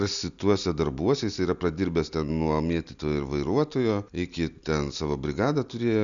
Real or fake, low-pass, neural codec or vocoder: real; 7.2 kHz; none